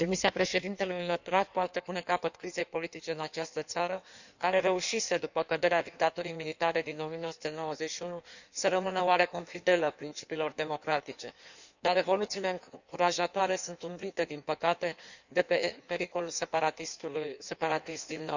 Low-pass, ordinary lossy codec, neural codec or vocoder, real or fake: 7.2 kHz; none; codec, 16 kHz in and 24 kHz out, 1.1 kbps, FireRedTTS-2 codec; fake